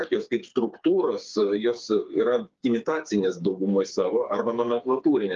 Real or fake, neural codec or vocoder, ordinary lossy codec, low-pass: fake; codec, 16 kHz, 4 kbps, FreqCodec, smaller model; Opus, 32 kbps; 7.2 kHz